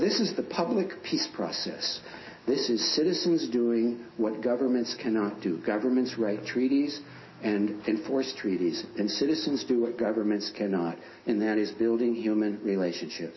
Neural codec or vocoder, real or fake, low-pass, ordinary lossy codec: none; real; 7.2 kHz; MP3, 24 kbps